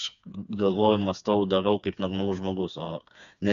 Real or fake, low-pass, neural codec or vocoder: fake; 7.2 kHz; codec, 16 kHz, 4 kbps, FreqCodec, smaller model